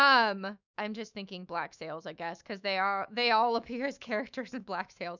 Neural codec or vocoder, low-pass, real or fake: autoencoder, 48 kHz, 128 numbers a frame, DAC-VAE, trained on Japanese speech; 7.2 kHz; fake